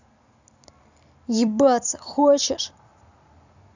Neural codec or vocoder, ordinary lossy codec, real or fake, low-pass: vocoder, 44.1 kHz, 128 mel bands every 256 samples, BigVGAN v2; none; fake; 7.2 kHz